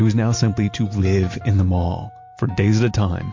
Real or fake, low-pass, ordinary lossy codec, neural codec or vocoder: real; 7.2 kHz; MP3, 48 kbps; none